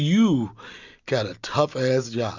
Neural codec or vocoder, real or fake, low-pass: none; real; 7.2 kHz